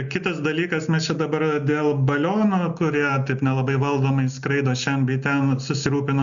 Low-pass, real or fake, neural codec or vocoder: 7.2 kHz; real; none